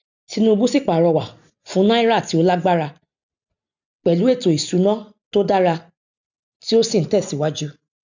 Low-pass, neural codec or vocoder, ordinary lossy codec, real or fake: 7.2 kHz; none; none; real